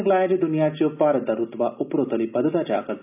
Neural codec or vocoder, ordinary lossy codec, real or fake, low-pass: none; none; real; 3.6 kHz